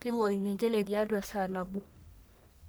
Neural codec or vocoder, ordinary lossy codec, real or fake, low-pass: codec, 44.1 kHz, 1.7 kbps, Pupu-Codec; none; fake; none